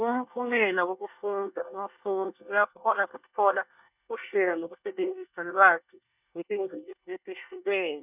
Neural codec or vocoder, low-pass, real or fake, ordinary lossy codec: codec, 24 kHz, 1 kbps, SNAC; 3.6 kHz; fake; none